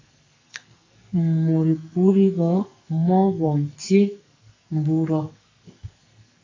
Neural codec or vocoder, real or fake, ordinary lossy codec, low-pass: codec, 44.1 kHz, 2.6 kbps, SNAC; fake; AAC, 32 kbps; 7.2 kHz